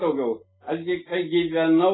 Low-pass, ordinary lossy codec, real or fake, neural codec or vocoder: 7.2 kHz; AAC, 16 kbps; real; none